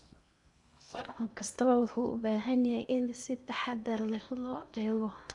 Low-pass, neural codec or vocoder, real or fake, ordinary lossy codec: 10.8 kHz; codec, 16 kHz in and 24 kHz out, 0.8 kbps, FocalCodec, streaming, 65536 codes; fake; none